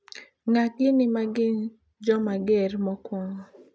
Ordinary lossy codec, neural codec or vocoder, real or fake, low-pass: none; none; real; none